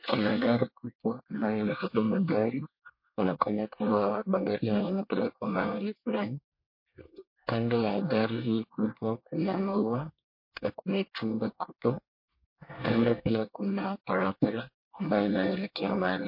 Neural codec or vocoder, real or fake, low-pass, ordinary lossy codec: codec, 24 kHz, 1 kbps, SNAC; fake; 5.4 kHz; MP3, 32 kbps